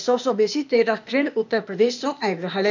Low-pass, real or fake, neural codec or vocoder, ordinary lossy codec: 7.2 kHz; fake; codec, 16 kHz, 0.8 kbps, ZipCodec; none